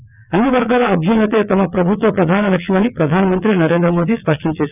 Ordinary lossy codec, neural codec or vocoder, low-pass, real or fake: none; vocoder, 22.05 kHz, 80 mel bands, WaveNeXt; 3.6 kHz; fake